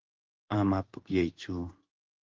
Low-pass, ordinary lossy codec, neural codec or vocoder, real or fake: 7.2 kHz; Opus, 16 kbps; codec, 16 kHz in and 24 kHz out, 1 kbps, XY-Tokenizer; fake